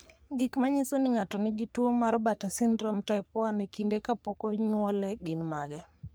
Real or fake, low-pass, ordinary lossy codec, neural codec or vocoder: fake; none; none; codec, 44.1 kHz, 3.4 kbps, Pupu-Codec